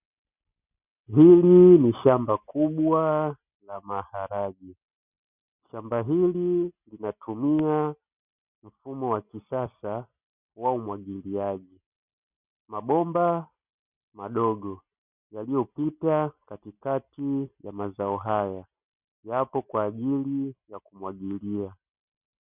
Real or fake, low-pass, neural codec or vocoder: real; 3.6 kHz; none